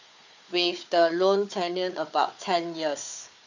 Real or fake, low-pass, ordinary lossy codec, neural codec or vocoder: fake; 7.2 kHz; none; codec, 16 kHz, 4 kbps, FunCodec, trained on Chinese and English, 50 frames a second